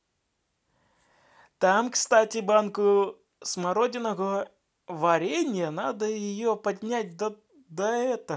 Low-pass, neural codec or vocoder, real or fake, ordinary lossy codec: none; none; real; none